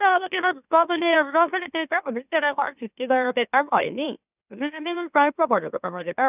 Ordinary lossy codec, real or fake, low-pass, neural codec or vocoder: none; fake; 3.6 kHz; autoencoder, 44.1 kHz, a latent of 192 numbers a frame, MeloTTS